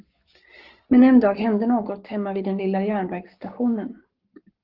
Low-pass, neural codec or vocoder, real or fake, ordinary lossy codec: 5.4 kHz; codec, 44.1 kHz, 7.8 kbps, Pupu-Codec; fake; Opus, 16 kbps